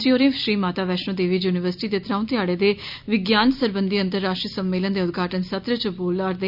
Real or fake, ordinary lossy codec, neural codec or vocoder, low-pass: real; none; none; 5.4 kHz